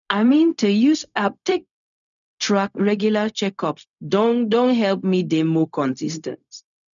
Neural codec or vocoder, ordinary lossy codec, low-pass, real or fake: codec, 16 kHz, 0.4 kbps, LongCat-Audio-Codec; none; 7.2 kHz; fake